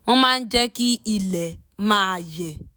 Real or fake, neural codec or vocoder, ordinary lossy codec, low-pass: fake; autoencoder, 48 kHz, 128 numbers a frame, DAC-VAE, trained on Japanese speech; none; none